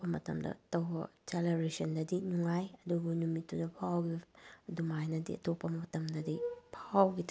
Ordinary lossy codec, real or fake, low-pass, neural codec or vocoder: none; real; none; none